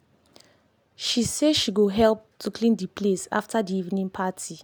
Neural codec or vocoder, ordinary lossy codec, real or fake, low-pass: none; none; real; none